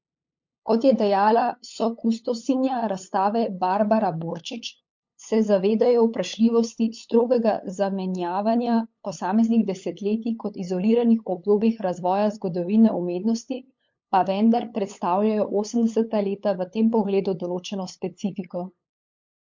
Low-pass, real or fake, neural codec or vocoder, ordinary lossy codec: 7.2 kHz; fake; codec, 16 kHz, 8 kbps, FunCodec, trained on LibriTTS, 25 frames a second; MP3, 48 kbps